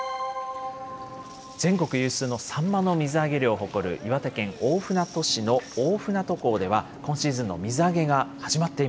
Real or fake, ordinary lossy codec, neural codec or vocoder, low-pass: real; none; none; none